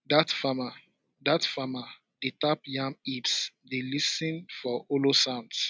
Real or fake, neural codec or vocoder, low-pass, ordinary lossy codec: real; none; none; none